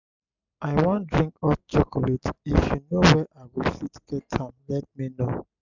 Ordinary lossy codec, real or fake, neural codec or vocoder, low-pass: none; real; none; 7.2 kHz